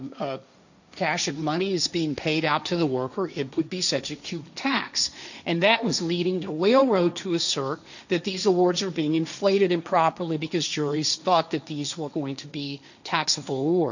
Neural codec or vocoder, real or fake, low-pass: codec, 16 kHz, 1.1 kbps, Voila-Tokenizer; fake; 7.2 kHz